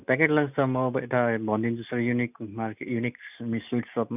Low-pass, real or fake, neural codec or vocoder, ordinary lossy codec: 3.6 kHz; real; none; none